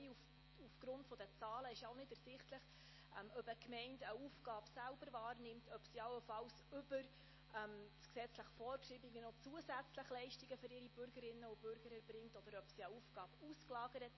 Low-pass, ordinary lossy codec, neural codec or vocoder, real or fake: 7.2 kHz; MP3, 24 kbps; none; real